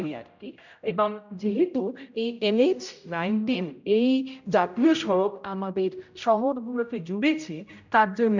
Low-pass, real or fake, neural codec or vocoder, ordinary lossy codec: 7.2 kHz; fake; codec, 16 kHz, 0.5 kbps, X-Codec, HuBERT features, trained on general audio; none